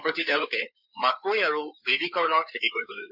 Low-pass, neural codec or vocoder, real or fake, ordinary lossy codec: 5.4 kHz; codec, 16 kHz in and 24 kHz out, 2.2 kbps, FireRedTTS-2 codec; fake; none